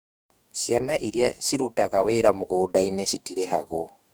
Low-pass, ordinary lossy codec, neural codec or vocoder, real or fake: none; none; codec, 44.1 kHz, 2.6 kbps, DAC; fake